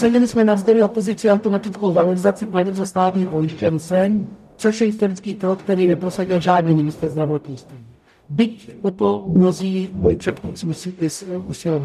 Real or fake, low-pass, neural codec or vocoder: fake; 14.4 kHz; codec, 44.1 kHz, 0.9 kbps, DAC